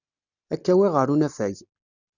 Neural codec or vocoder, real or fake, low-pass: none; real; 7.2 kHz